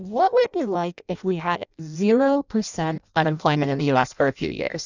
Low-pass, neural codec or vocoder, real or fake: 7.2 kHz; codec, 16 kHz in and 24 kHz out, 0.6 kbps, FireRedTTS-2 codec; fake